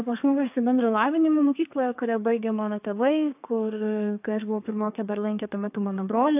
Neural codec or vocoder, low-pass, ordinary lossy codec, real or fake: codec, 32 kHz, 1.9 kbps, SNAC; 3.6 kHz; AAC, 32 kbps; fake